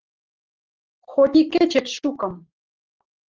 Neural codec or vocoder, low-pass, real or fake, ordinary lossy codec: none; 7.2 kHz; real; Opus, 16 kbps